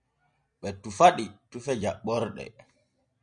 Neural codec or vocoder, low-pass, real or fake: none; 9.9 kHz; real